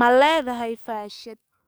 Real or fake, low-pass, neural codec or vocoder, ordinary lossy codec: fake; none; codec, 44.1 kHz, 7.8 kbps, DAC; none